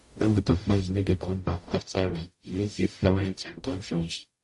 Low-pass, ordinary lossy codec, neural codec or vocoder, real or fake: 14.4 kHz; MP3, 48 kbps; codec, 44.1 kHz, 0.9 kbps, DAC; fake